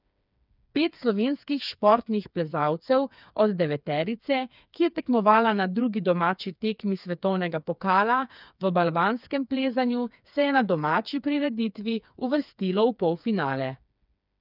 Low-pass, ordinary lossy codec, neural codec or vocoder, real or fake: 5.4 kHz; none; codec, 16 kHz, 4 kbps, FreqCodec, smaller model; fake